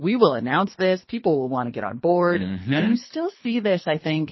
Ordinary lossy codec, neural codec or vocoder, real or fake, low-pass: MP3, 24 kbps; codec, 24 kHz, 3 kbps, HILCodec; fake; 7.2 kHz